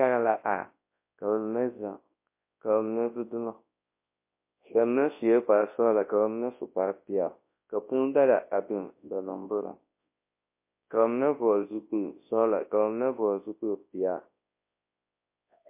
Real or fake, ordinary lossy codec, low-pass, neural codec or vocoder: fake; MP3, 24 kbps; 3.6 kHz; codec, 24 kHz, 0.9 kbps, WavTokenizer, large speech release